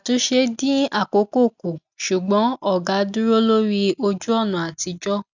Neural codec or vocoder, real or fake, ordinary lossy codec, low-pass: none; real; none; 7.2 kHz